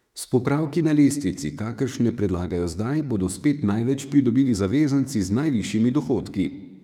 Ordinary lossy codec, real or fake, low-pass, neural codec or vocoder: none; fake; 19.8 kHz; autoencoder, 48 kHz, 32 numbers a frame, DAC-VAE, trained on Japanese speech